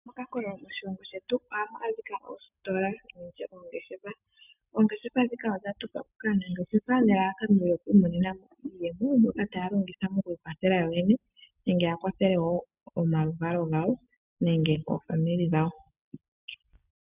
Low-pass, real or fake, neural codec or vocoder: 3.6 kHz; real; none